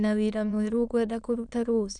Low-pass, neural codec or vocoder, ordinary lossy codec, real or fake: 9.9 kHz; autoencoder, 22.05 kHz, a latent of 192 numbers a frame, VITS, trained on many speakers; none; fake